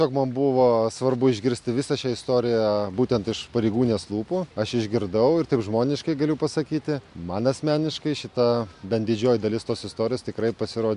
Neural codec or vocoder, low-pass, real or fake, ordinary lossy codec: none; 10.8 kHz; real; MP3, 64 kbps